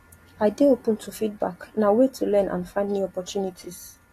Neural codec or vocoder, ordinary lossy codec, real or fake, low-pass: none; AAC, 48 kbps; real; 14.4 kHz